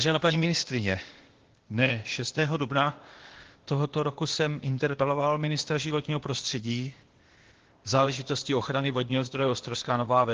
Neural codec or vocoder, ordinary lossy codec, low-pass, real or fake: codec, 16 kHz, 0.8 kbps, ZipCodec; Opus, 16 kbps; 7.2 kHz; fake